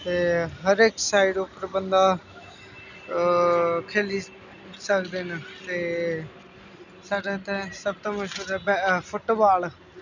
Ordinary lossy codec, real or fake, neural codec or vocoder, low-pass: none; real; none; 7.2 kHz